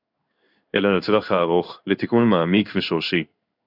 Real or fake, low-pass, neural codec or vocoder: fake; 5.4 kHz; codec, 16 kHz in and 24 kHz out, 1 kbps, XY-Tokenizer